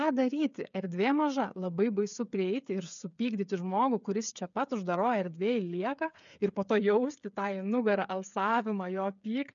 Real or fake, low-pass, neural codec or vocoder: fake; 7.2 kHz; codec, 16 kHz, 8 kbps, FreqCodec, smaller model